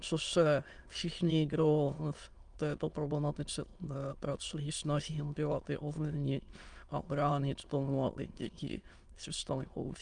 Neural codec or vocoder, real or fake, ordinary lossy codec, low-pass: autoencoder, 22.05 kHz, a latent of 192 numbers a frame, VITS, trained on many speakers; fake; Opus, 32 kbps; 9.9 kHz